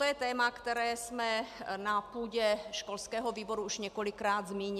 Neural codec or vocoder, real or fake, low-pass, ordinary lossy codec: none; real; 14.4 kHz; AAC, 96 kbps